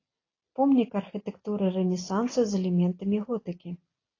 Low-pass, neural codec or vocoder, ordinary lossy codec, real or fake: 7.2 kHz; none; AAC, 32 kbps; real